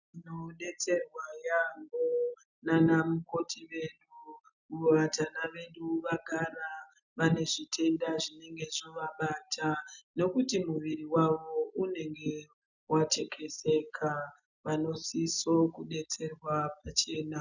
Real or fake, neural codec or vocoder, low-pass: real; none; 7.2 kHz